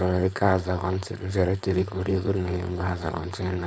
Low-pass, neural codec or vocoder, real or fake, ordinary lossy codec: none; codec, 16 kHz, 4.8 kbps, FACodec; fake; none